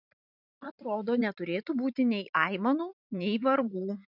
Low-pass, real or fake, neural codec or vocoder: 5.4 kHz; fake; vocoder, 44.1 kHz, 128 mel bands every 512 samples, BigVGAN v2